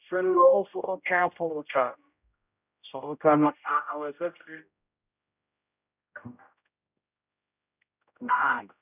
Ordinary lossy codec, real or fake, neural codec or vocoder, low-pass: none; fake; codec, 16 kHz, 0.5 kbps, X-Codec, HuBERT features, trained on general audio; 3.6 kHz